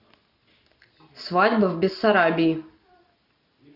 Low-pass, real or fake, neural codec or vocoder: 5.4 kHz; real; none